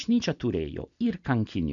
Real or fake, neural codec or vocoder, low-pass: real; none; 7.2 kHz